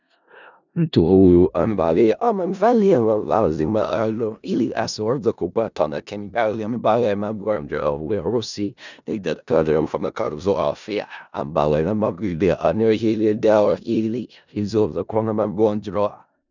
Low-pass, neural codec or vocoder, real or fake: 7.2 kHz; codec, 16 kHz in and 24 kHz out, 0.4 kbps, LongCat-Audio-Codec, four codebook decoder; fake